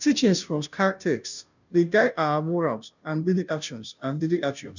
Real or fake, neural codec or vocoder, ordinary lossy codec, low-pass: fake; codec, 16 kHz, 0.5 kbps, FunCodec, trained on Chinese and English, 25 frames a second; none; 7.2 kHz